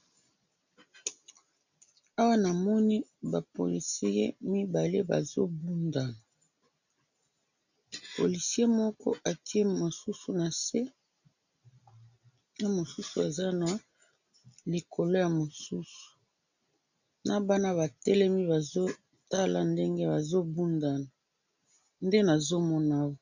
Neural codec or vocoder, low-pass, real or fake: none; 7.2 kHz; real